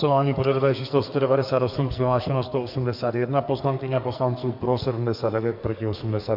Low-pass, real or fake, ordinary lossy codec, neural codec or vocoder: 5.4 kHz; fake; MP3, 48 kbps; codec, 44.1 kHz, 2.6 kbps, SNAC